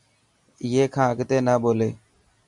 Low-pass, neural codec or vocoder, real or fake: 10.8 kHz; none; real